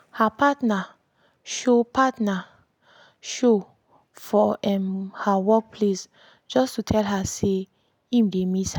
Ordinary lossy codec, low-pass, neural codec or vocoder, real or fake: none; 19.8 kHz; none; real